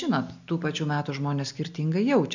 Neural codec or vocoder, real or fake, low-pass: none; real; 7.2 kHz